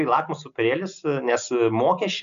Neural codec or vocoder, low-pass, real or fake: none; 7.2 kHz; real